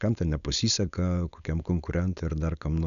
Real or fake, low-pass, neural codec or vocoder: fake; 7.2 kHz; codec, 16 kHz, 4.8 kbps, FACodec